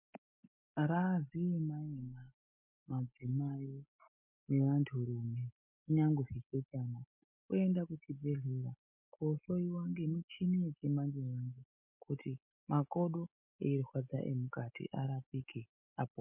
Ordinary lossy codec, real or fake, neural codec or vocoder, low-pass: MP3, 32 kbps; real; none; 3.6 kHz